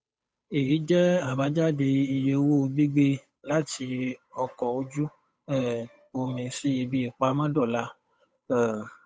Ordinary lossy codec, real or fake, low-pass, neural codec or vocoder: none; fake; none; codec, 16 kHz, 8 kbps, FunCodec, trained on Chinese and English, 25 frames a second